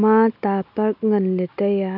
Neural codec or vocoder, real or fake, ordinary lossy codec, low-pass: none; real; none; 5.4 kHz